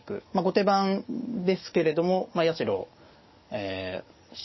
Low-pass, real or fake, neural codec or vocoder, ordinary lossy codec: 7.2 kHz; fake; codec, 44.1 kHz, 7.8 kbps, DAC; MP3, 24 kbps